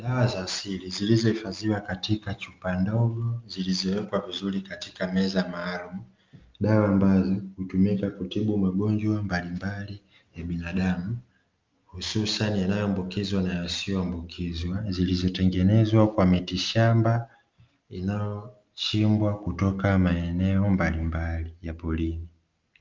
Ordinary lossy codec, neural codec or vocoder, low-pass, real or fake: Opus, 24 kbps; none; 7.2 kHz; real